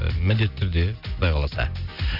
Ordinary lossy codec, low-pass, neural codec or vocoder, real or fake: none; 5.4 kHz; none; real